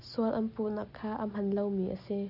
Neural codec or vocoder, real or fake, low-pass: none; real; 5.4 kHz